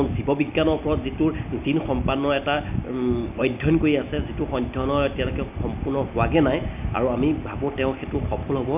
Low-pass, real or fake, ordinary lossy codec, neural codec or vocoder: 3.6 kHz; real; none; none